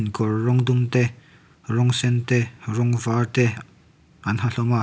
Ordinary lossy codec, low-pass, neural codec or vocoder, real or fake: none; none; none; real